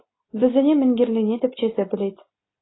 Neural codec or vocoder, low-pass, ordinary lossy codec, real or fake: none; 7.2 kHz; AAC, 16 kbps; real